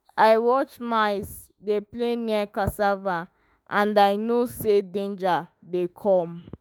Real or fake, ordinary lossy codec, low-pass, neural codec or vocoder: fake; none; none; autoencoder, 48 kHz, 32 numbers a frame, DAC-VAE, trained on Japanese speech